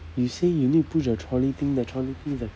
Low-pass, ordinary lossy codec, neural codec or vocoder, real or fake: none; none; none; real